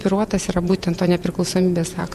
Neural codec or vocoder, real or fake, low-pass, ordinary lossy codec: vocoder, 48 kHz, 128 mel bands, Vocos; fake; 14.4 kHz; MP3, 96 kbps